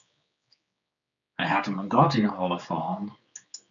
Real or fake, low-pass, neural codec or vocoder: fake; 7.2 kHz; codec, 16 kHz, 4 kbps, X-Codec, HuBERT features, trained on general audio